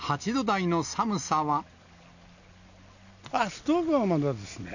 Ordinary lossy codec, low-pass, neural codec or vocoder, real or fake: none; 7.2 kHz; none; real